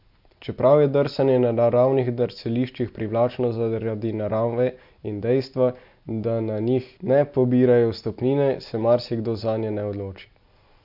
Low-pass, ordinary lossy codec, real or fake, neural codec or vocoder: 5.4 kHz; MP3, 48 kbps; real; none